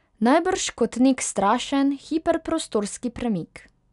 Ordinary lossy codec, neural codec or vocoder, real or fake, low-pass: none; none; real; 10.8 kHz